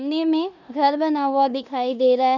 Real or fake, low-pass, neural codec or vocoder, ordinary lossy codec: fake; 7.2 kHz; codec, 16 kHz in and 24 kHz out, 0.9 kbps, LongCat-Audio-Codec, four codebook decoder; none